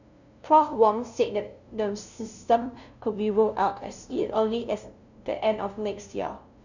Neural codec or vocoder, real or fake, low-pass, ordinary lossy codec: codec, 16 kHz, 0.5 kbps, FunCodec, trained on LibriTTS, 25 frames a second; fake; 7.2 kHz; none